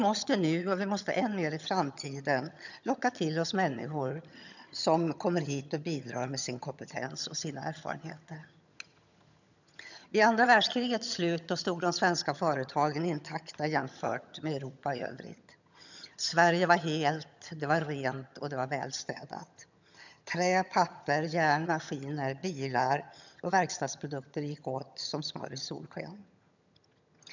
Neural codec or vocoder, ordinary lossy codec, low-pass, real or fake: vocoder, 22.05 kHz, 80 mel bands, HiFi-GAN; none; 7.2 kHz; fake